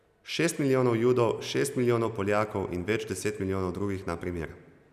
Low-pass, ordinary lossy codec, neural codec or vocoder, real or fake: 14.4 kHz; none; none; real